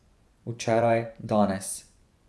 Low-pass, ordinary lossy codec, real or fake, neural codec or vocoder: none; none; fake; vocoder, 24 kHz, 100 mel bands, Vocos